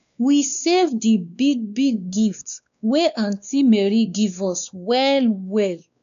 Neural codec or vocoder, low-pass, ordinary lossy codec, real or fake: codec, 16 kHz, 2 kbps, X-Codec, WavLM features, trained on Multilingual LibriSpeech; 7.2 kHz; none; fake